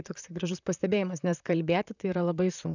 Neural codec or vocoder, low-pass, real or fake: vocoder, 44.1 kHz, 128 mel bands, Pupu-Vocoder; 7.2 kHz; fake